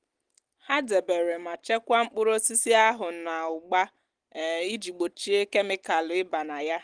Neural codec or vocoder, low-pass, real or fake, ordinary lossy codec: none; 9.9 kHz; real; Opus, 24 kbps